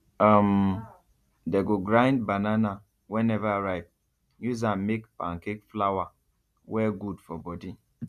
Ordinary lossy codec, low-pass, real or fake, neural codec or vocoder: none; 14.4 kHz; real; none